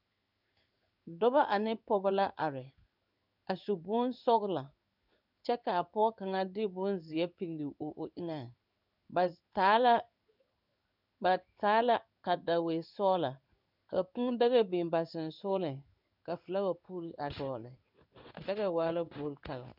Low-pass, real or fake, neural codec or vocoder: 5.4 kHz; fake; codec, 16 kHz in and 24 kHz out, 1 kbps, XY-Tokenizer